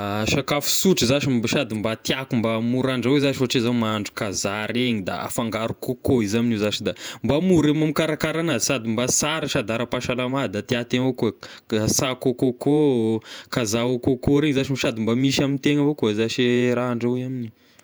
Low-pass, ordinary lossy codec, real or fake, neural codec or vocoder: none; none; fake; vocoder, 48 kHz, 128 mel bands, Vocos